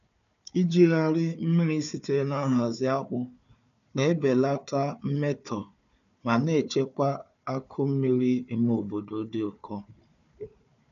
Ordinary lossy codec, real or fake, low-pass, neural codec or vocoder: none; fake; 7.2 kHz; codec, 16 kHz, 4 kbps, FunCodec, trained on Chinese and English, 50 frames a second